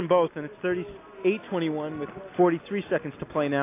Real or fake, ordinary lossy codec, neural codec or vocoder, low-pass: real; AAC, 24 kbps; none; 3.6 kHz